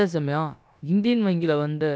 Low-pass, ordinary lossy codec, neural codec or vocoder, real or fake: none; none; codec, 16 kHz, about 1 kbps, DyCAST, with the encoder's durations; fake